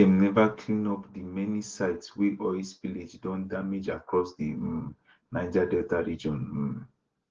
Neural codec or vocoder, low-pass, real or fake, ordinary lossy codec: none; 7.2 kHz; real; Opus, 16 kbps